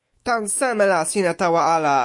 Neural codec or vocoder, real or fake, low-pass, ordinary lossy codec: autoencoder, 48 kHz, 128 numbers a frame, DAC-VAE, trained on Japanese speech; fake; 10.8 kHz; MP3, 48 kbps